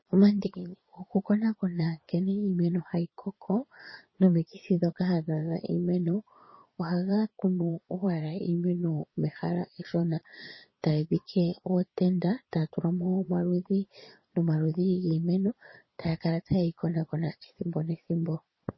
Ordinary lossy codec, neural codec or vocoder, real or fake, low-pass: MP3, 24 kbps; vocoder, 22.05 kHz, 80 mel bands, Vocos; fake; 7.2 kHz